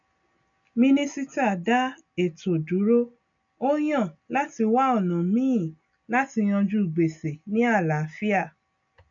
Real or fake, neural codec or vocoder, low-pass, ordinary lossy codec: real; none; 7.2 kHz; none